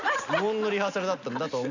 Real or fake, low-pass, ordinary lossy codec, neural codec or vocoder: real; 7.2 kHz; none; none